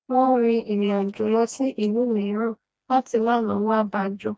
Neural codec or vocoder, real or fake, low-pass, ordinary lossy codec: codec, 16 kHz, 1 kbps, FreqCodec, smaller model; fake; none; none